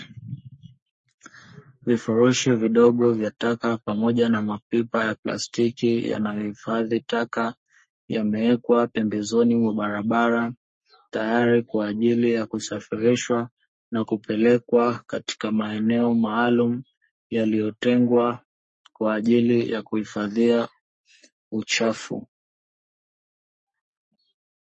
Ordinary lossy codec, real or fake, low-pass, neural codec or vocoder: MP3, 32 kbps; fake; 10.8 kHz; codec, 44.1 kHz, 3.4 kbps, Pupu-Codec